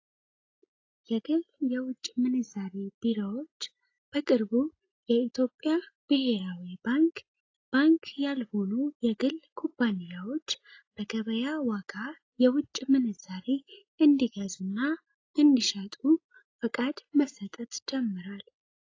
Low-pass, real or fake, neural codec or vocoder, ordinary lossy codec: 7.2 kHz; real; none; AAC, 32 kbps